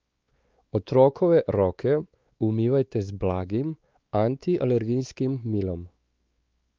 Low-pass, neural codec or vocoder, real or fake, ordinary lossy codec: 7.2 kHz; codec, 16 kHz, 4 kbps, X-Codec, WavLM features, trained on Multilingual LibriSpeech; fake; Opus, 24 kbps